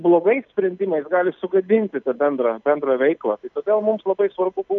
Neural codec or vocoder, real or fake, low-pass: none; real; 7.2 kHz